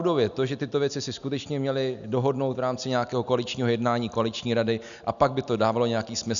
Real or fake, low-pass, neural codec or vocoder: real; 7.2 kHz; none